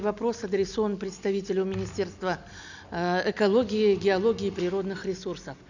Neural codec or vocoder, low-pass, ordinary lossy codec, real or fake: none; 7.2 kHz; none; real